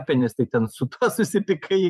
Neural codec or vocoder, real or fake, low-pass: vocoder, 44.1 kHz, 128 mel bands every 512 samples, BigVGAN v2; fake; 14.4 kHz